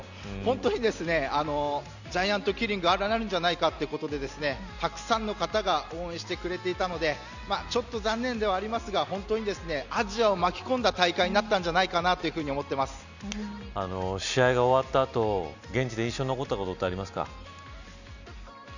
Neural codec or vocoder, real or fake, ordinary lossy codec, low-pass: none; real; none; 7.2 kHz